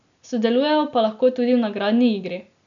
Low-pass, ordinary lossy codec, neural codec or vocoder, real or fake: 7.2 kHz; MP3, 96 kbps; none; real